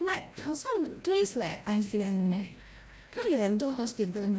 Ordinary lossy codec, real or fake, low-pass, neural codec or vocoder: none; fake; none; codec, 16 kHz, 0.5 kbps, FreqCodec, larger model